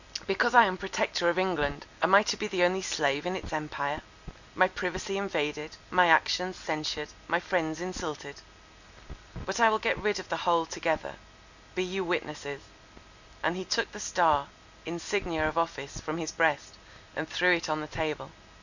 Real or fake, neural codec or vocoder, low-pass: real; none; 7.2 kHz